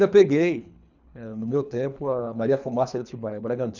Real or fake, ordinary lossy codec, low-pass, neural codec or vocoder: fake; none; 7.2 kHz; codec, 24 kHz, 3 kbps, HILCodec